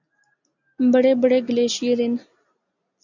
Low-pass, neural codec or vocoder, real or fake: 7.2 kHz; none; real